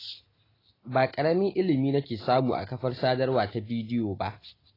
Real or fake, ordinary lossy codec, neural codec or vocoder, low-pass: real; AAC, 24 kbps; none; 5.4 kHz